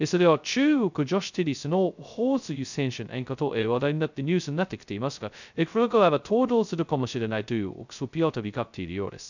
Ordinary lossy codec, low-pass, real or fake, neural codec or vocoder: none; 7.2 kHz; fake; codec, 16 kHz, 0.2 kbps, FocalCodec